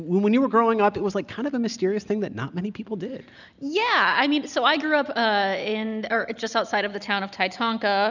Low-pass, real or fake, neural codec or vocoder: 7.2 kHz; real; none